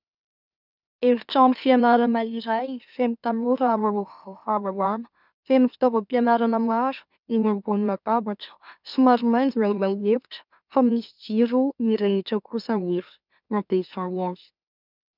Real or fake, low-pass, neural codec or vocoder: fake; 5.4 kHz; autoencoder, 44.1 kHz, a latent of 192 numbers a frame, MeloTTS